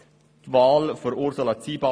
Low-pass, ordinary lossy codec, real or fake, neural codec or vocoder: none; none; real; none